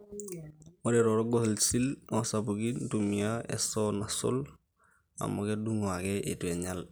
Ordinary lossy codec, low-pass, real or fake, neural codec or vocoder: none; none; real; none